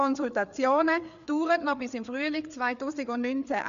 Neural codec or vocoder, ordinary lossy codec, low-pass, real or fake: codec, 16 kHz, 4 kbps, FreqCodec, larger model; none; 7.2 kHz; fake